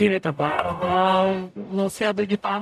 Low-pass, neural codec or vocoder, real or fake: 14.4 kHz; codec, 44.1 kHz, 0.9 kbps, DAC; fake